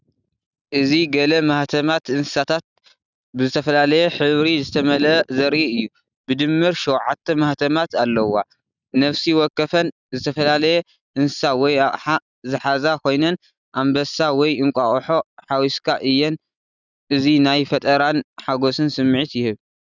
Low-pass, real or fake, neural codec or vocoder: 7.2 kHz; real; none